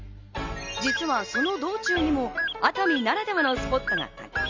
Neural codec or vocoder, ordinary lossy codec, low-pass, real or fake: none; Opus, 32 kbps; 7.2 kHz; real